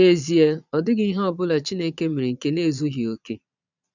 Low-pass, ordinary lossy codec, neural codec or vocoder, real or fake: 7.2 kHz; none; vocoder, 22.05 kHz, 80 mel bands, Vocos; fake